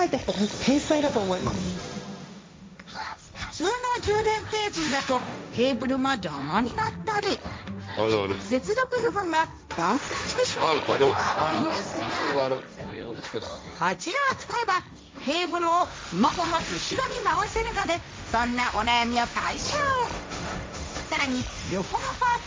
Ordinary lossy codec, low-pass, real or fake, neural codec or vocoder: none; none; fake; codec, 16 kHz, 1.1 kbps, Voila-Tokenizer